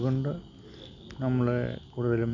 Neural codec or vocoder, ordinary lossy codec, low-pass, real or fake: none; none; 7.2 kHz; real